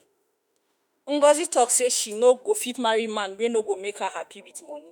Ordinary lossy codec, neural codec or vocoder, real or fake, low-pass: none; autoencoder, 48 kHz, 32 numbers a frame, DAC-VAE, trained on Japanese speech; fake; none